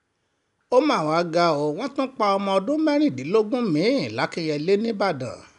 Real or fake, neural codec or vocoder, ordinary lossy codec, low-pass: real; none; none; 10.8 kHz